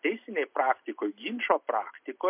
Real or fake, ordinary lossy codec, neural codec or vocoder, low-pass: real; MP3, 32 kbps; none; 3.6 kHz